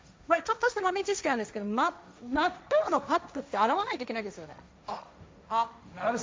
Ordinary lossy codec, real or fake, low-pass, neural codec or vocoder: none; fake; none; codec, 16 kHz, 1.1 kbps, Voila-Tokenizer